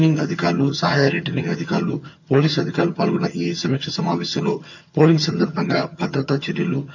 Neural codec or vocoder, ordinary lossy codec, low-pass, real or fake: vocoder, 22.05 kHz, 80 mel bands, HiFi-GAN; none; 7.2 kHz; fake